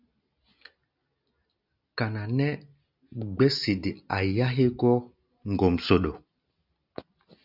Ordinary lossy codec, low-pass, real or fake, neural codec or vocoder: Opus, 64 kbps; 5.4 kHz; real; none